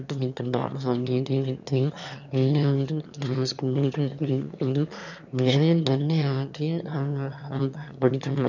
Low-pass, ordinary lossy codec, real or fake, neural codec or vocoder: 7.2 kHz; none; fake; autoencoder, 22.05 kHz, a latent of 192 numbers a frame, VITS, trained on one speaker